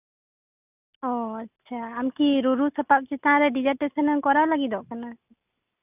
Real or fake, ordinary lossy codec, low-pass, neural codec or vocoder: real; none; 3.6 kHz; none